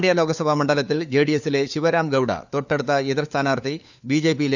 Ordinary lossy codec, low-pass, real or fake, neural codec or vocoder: none; 7.2 kHz; fake; codec, 16 kHz, 4 kbps, FunCodec, trained on LibriTTS, 50 frames a second